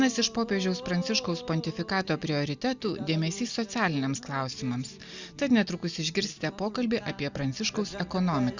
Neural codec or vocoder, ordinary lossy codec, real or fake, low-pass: none; Opus, 64 kbps; real; 7.2 kHz